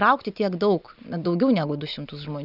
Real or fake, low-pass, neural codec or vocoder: real; 5.4 kHz; none